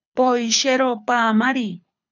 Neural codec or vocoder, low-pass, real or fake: codec, 24 kHz, 6 kbps, HILCodec; 7.2 kHz; fake